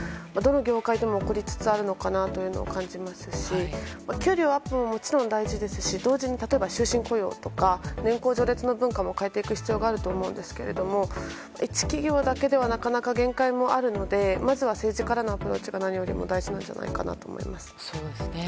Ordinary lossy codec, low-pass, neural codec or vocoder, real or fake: none; none; none; real